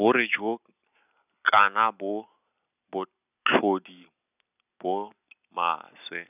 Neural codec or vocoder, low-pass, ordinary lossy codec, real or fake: none; 3.6 kHz; none; real